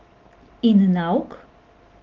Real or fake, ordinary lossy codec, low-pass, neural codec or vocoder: real; Opus, 16 kbps; 7.2 kHz; none